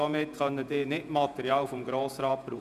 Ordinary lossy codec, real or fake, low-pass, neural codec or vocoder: none; fake; 14.4 kHz; vocoder, 48 kHz, 128 mel bands, Vocos